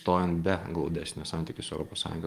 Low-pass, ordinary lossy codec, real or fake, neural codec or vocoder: 14.4 kHz; Opus, 32 kbps; fake; codec, 44.1 kHz, 7.8 kbps, DAC